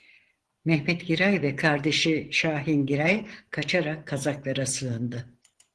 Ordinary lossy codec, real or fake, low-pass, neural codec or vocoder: Opus, 16 kbps; fake; 10.8 kHz; vocoder, 24 kHz, 100 mel bands, Vocos